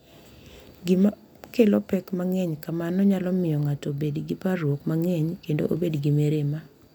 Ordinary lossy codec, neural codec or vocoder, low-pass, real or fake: none; none; 19.8 kHz; real